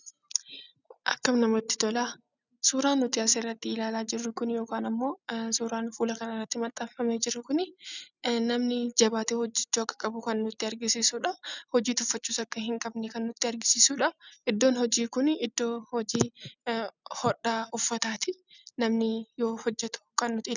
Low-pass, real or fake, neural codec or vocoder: 7.2 kHz; real; none